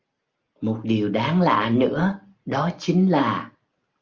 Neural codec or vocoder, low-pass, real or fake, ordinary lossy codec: none; 7.2 kHz; real; Opus, 24 kbps